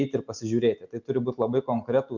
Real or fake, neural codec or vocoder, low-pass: real; none; 7.2 kHz